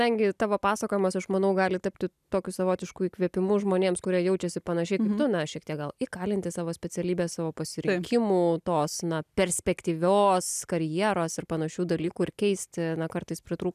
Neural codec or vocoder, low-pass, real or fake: none; 14.4 kHz; real